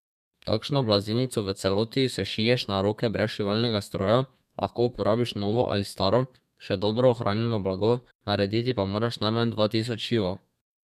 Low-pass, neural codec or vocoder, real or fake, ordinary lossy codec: 14.4 kHz; codec, 32 kHz, 1.9 kbps, SNAC; fake; none